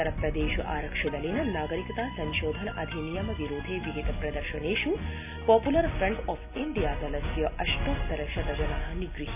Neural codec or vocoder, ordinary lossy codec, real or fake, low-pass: none; AAC, 32 kbps; real; 3.6 kHz